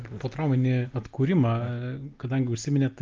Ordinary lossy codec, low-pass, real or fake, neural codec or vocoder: Opus, 24 kbps; 7.2 kHz; real; none